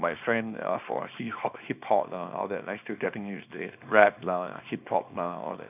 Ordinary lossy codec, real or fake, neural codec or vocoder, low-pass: none; fake; codec, 24 kHz, 0.9 kbps, WavTokenizer, small release; 3.6 kHz